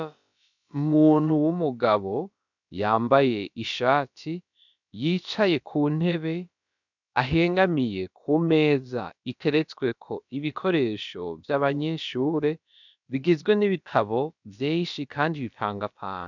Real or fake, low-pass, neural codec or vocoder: fake; 7.2 kHz; codec, 16 kHz, about 1 kbps, DyCAST, with the encoder's durations